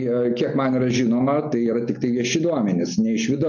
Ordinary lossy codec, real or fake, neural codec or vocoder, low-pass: MP3, 48 kbps; real; none; 7.2 kHz